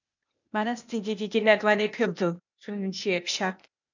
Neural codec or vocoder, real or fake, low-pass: codec, 16 kHz, 0.8 kbps, ZipCodec; fake; 7.2 kHz